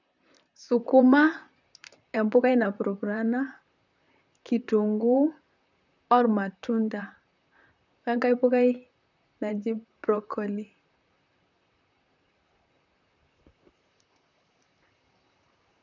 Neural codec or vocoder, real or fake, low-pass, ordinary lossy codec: vocoder, 44.1 kHz, 128 mel bands, Pupu-Vocoder; fake; 7.2 kHz; none